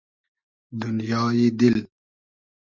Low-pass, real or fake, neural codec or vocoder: 7.2 kHz; real; none